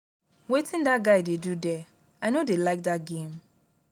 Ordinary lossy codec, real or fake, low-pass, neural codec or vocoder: none; real; 19.8 kHz; none